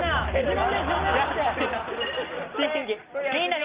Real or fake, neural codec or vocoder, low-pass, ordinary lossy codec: real; none; 3.6 kHz; Opus, 32 kbps